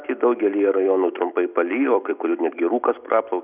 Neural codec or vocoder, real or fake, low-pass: none; real; 3.6 kHz